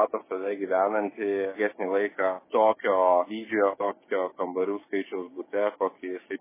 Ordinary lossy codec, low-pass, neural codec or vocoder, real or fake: MP3, 16 kbps; 3.6 kHz; codec, 16 kHz, 6 kbps, DAC; fake